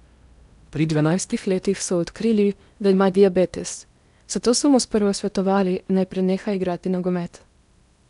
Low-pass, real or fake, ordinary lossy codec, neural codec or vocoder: 10.8 kHz; fake; none; codec, 16 kHz in and 24 kHz out, 0.8 kbps, FocalCodec, streaming, 65536 codes